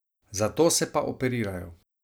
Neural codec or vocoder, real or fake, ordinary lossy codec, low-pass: none; real; none; none